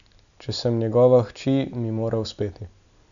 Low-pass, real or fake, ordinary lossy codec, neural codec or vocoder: 7.2 kHz; real; MP3, 96 kbps; none